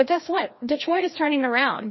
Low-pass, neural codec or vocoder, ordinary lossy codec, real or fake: 7.2 kHz; codec, 16 kHz, 1 kbps, FreqCodec, larger model; MP3, 24 kbps; fake